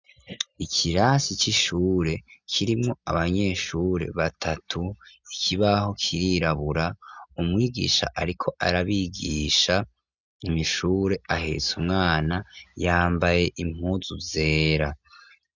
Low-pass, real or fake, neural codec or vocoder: 7.2 kHz; real; none